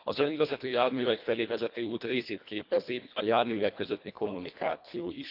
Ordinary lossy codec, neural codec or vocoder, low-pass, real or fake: none; codec, 24 kHz, 1.5 kbps, HILCodec; 5.4 kHz; fake